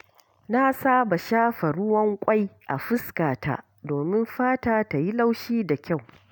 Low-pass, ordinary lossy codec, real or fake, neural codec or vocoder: none; none; real; none